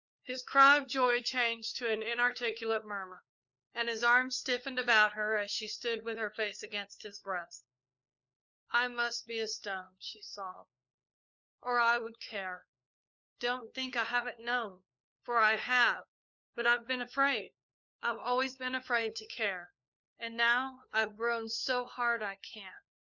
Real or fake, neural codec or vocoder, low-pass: fake; codec, 16 kHz, 4 kbps, FunCodec, trained on LibriTTS, 50 frames a second; 7.2 kHz